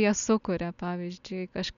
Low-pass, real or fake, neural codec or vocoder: 7.2 kHz; real; none